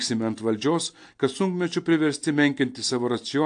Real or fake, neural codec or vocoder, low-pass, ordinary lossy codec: real; none; 9.9 kHz; AAC, 64 kbps